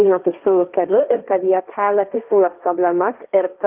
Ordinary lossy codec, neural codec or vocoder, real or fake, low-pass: Opus, 24 kbps; codec, 16 kHz, 1.1 kbps, Voila-Tokenizer; fake; 3.6 kHz